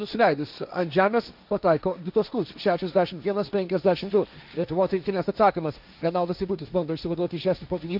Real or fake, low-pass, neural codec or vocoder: fake; 5.4 kHz; codec, 16 kHz, 1.1 kbps, Voila-Tokenizer